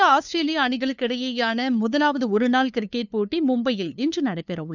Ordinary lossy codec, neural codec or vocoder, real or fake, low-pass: none; codec, 16 kHz, 2 kbps, FunCodec, trained on LibriTTS, 25 frames a second; fake; 7.2 kHz